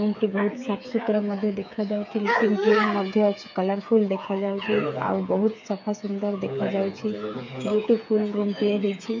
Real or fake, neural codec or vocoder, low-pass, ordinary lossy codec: fake; codec, 16 kHz, 8 kbps, FreqCodec, smaller model; 7.2 kHz; MP3, 48 kbps